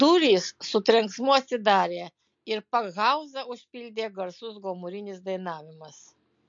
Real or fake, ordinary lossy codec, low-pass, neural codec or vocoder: real; MP3, 48 kbps; 7.2 kHz; none